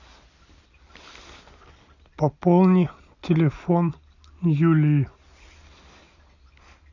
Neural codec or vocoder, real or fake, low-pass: none; real; 7.2 kHz